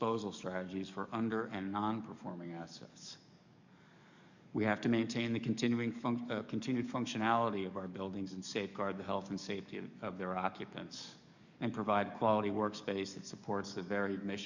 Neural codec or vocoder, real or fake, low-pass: codec, 44.1 kHz, 7.8 kbps, DAC; fake; 7.2 kHz